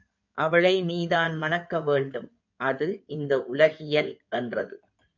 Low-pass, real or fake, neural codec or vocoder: 7.2 kHz; fake; codec, 16 kHz in and 24 kHz out, 2.2 kbps, FireRedTTS-2 codec